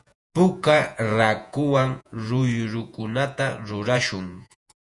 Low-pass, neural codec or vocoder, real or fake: 10.8 kHz; vocoder, 48 kHz, 128 mel bands, Vocos; fake